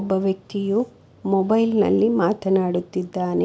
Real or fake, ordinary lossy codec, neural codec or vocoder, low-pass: real; none; none; none